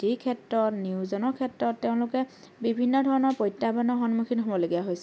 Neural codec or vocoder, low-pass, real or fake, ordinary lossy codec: none; none; real; none